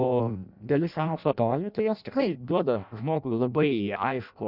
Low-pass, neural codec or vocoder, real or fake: 5.4 kHz; codec, 16 kHz in and 24 kHz out, 0.6 kbps, FireRedTTS-2 codec; fake